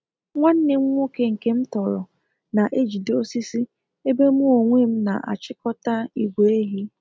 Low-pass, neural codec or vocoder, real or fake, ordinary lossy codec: none; none; real; none